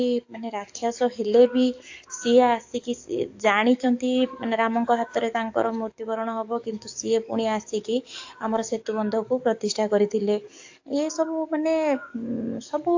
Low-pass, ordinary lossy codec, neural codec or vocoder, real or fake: 7.2 kHz; AAC, 48 kbps; codec, 44.1 kHz, 7.8 kbps, DAC; fake